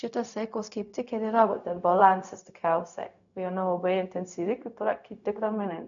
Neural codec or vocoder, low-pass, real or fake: codec, 16 kHz, 0.4 kbps, LongCat-Audio-Codec; 7.2 kHz; fake